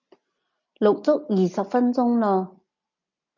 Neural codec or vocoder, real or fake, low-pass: none; real; 7.2 kHz